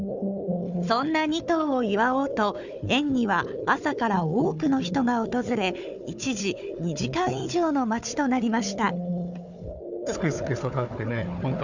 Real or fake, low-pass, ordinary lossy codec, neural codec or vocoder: fake; 7.2 kHz; none; codec, 16 kHz, 4 kbps, FunCodec, trained on Chinese and English, 50 frames a second